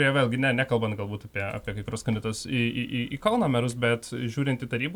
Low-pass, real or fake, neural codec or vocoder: 19.8 kHz; real; none